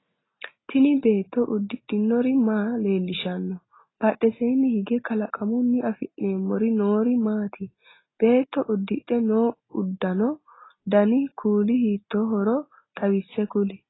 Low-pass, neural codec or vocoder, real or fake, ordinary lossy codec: 7.2 kHz; none; real; AAC, 16 kbps